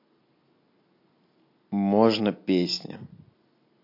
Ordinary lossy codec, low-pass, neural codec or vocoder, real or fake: MP3, 32 kbps; 5.4 kHz; none; real